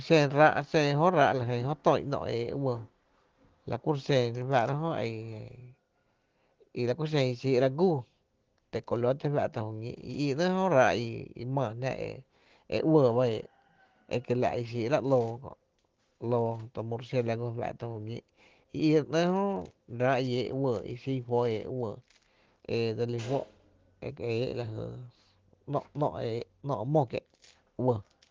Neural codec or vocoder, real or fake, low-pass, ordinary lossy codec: none; real; 7.2 kHz; Opus, 16 kbps